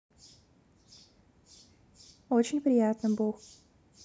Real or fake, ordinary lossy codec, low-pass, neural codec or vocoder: real; none; none; none